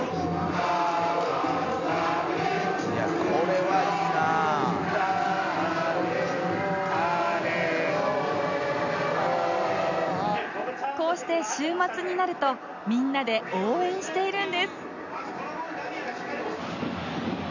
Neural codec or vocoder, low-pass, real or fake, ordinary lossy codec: none; 7.2 kHz; real; none